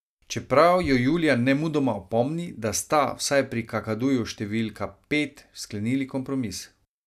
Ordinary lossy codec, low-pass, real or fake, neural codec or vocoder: none; 14.4 kHz; real; none